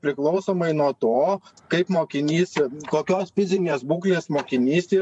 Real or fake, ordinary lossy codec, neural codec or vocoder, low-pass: fake; MP3, 64 kbps; vocoder, 44.1 kHz, 128 mel bands every 512 samples, BigVGAN v2; 10.8 kHz